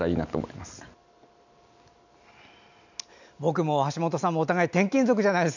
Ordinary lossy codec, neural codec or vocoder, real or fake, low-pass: none; none; real; 7.2 kHz